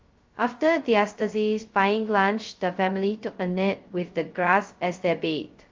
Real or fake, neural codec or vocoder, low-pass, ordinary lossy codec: fake; codec, 16 kHz, 0.2 kbps, FocalCodec; 7.2 kHz; Opus, 32 kbps